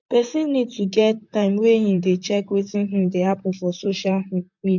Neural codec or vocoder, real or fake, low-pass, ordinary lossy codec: vocoder, 44.1 kHz, 128 mel bands, Pupu-Vocoder; fake; 7.2 kHz; MP3, 64 kbps